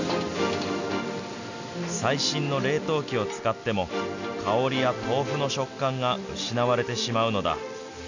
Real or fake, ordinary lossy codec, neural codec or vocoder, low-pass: real; none; none; 7.2 kHz